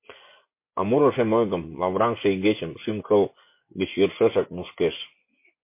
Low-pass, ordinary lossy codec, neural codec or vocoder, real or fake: 3.6 kHz; MP3, 24 kbps; vocoder, 24 kHz, 100 mel bands, Vocos; fake